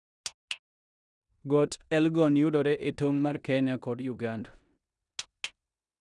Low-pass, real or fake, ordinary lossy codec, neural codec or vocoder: 10.8 kHz; fake; none; codec, 16 kHz in and 24 kHz out, 0.9 kbps, LongCat-Audio-Codec, four codebook decoder